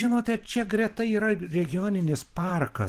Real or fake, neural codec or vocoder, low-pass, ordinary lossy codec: fake; vocoder, 44.1 kHz, 128 mel bands every 512 samples, BigVGAN v2; 14.4 kHz; Opus, 16 kbps